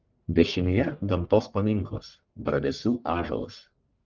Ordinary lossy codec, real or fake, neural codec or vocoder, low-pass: Opus, 24 kbps; fake; codec, 44.1 kHz, 1.7 kbps, Pupu-Codec; 7.2 kHz